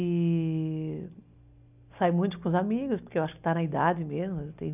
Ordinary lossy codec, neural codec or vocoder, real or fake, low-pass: none; none; real; 3.6 kHz